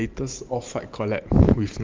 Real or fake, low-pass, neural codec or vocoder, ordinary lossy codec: fake; 7.2 kHz; vocoder, 44.1 kHz, 128 mel bands every 512 samples, BigVGAN v2; Opus, 32 kbps